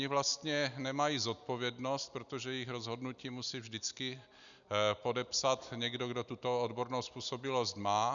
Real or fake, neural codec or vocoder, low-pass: real; none; 7.2 kHz